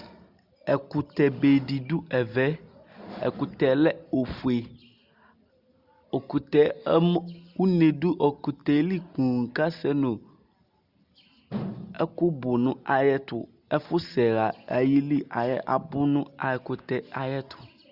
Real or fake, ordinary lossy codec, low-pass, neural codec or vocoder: real; Opus, 64 kbps; 5.4 kHz; none